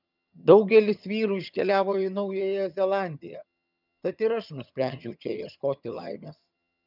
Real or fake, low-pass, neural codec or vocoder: fake; 5.4 kHz; vocoder, 22.05 kHz, 80 mel bands, HiFi-GAN